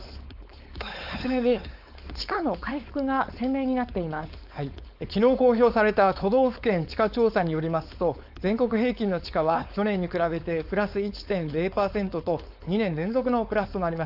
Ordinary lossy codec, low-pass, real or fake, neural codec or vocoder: AAC, 48 kbps; 5.4 kHz; fake; codec, 16 kHz, 4.8 kbps, FACodec